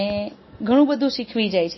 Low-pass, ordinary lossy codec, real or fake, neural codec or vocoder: 7.2 kHz; MP3, 24 kbps; real; none